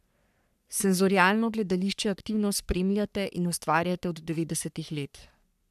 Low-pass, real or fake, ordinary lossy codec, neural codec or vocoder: 14.4 kHz; fake; none; codec, 44.1 kHz, 3.4 kbps, Pupu-Codec